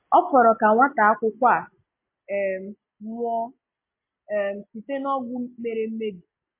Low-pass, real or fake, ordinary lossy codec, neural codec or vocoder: 3.6 kHz; real; MP3, 24 kbps; none